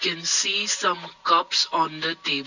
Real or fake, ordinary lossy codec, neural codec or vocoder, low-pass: real; none; none; 7.2 kHz